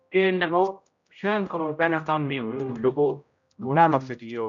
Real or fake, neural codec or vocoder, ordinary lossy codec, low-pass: fake; codec, 16 kHz, 0.5 kbps, X-Codec, HuBERT features, trained on general audio; AAC, 64 kbps; 7.2 kHz